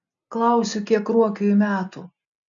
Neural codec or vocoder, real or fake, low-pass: none; real; 7.2 kHz